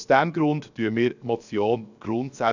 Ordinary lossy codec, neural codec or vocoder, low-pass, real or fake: none; codec, 16 kHz, 0.7 kbps, FocalCodec; 7.2 kHz; fake